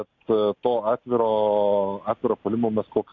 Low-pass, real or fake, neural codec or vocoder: 7.2 kHz; real; none